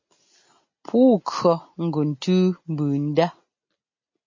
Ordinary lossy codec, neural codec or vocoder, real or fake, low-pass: MP3, 32 kbps; none; real; 7.2 kHz